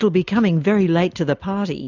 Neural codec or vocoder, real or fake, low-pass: none; real; 7.2 kHz